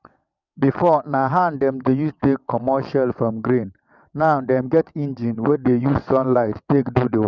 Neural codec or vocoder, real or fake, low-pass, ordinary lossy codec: vocoder, 22.05 kHz, 80 mel bands, WaveNeXt; fake; 7.2 kHz; none